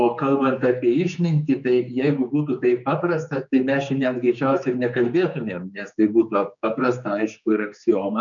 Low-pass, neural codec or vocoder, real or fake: 7.2 kHz; codec, 16 kHz, 4 kbps, X-Codec, HuBERT features, trained on general audio; fake